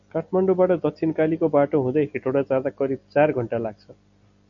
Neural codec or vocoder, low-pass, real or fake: none; 7.2 kHz; real